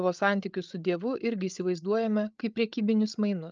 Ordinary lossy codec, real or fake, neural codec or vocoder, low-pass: Opus, 24 kbps; fake; codec, 16 kHz, 8 kbps, FreqCodec, larger model; 7.2 kHz